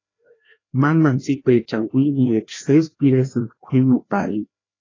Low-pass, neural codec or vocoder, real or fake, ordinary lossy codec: 7.2 kHz; codec, 16 kHz, 1 kbps, FreqCodec, larger model; fake; AAC, 32 kbps